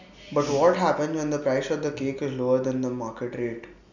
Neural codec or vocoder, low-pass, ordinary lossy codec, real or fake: none; 7.2 kHz; none; real